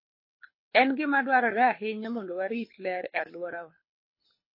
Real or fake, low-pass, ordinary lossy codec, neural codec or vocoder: fake; 5.4 kHz; MP3, 24 kbps; codec, 24 kHz, 6 kbps, HILCodec